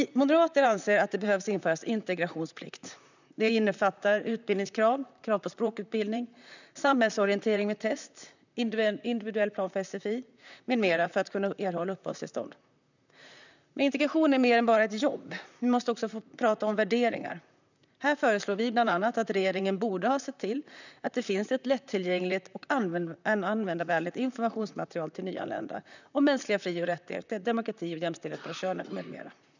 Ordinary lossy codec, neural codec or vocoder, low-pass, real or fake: none; vocoder, 44.1 kHz, 128 mel bands, Pupu-Vocoder; 7.2 kHz; fake